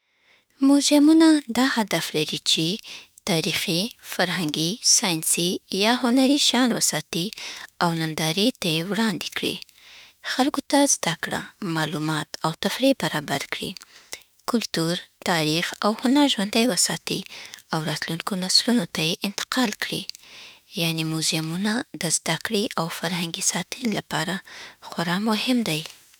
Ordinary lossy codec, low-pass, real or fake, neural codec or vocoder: none; none; fake; autoencoder, 48 kHz, 32 numbers a frame, DAC-VAE, trained on Japanese speech